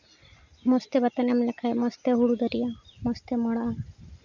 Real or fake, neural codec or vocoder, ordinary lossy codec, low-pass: real; none; none; 7.2 kHz